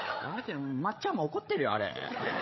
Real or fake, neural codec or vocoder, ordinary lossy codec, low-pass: fake; codec, 16 kHz, 16 kbps, FreqCodec, larger model; MP3, 24 kbps; 7.2 kHz